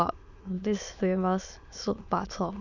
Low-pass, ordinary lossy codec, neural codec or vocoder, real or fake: 7.2 kHz; none; autoencoder, 22.05 kHz, a latent of 192 numbers a frame, VITS, trained on many speakers; fake